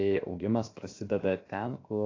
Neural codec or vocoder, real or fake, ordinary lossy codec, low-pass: codec, 16 kHz, 0.7 kbps, FocalCodec; fake; AAC, 32 kbps; 7.2 kHz